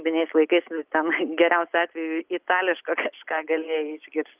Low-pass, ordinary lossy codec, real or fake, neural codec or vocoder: 3.6 kHz; Opus, 24 kbps; real; none